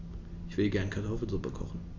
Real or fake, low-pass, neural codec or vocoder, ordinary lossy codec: real; 7.2 kHz; none; none